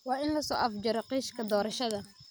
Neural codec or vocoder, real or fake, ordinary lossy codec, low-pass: none; real; none; none